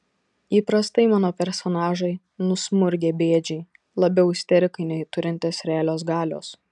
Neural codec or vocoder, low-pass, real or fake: none; 10.8 kHz; real